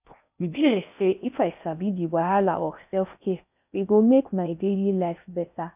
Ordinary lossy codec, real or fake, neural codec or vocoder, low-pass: none; fake; codec, 16 kHz in and 24 kHz out, 0.6 kbps, FocalCodec, streaming, 4096 codes; 3.6 kHz